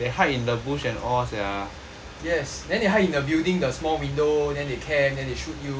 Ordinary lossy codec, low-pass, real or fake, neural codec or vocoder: none; none; real; none